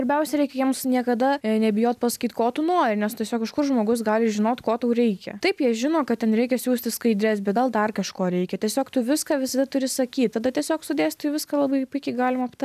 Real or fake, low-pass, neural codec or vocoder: real; 14.4 kHz; none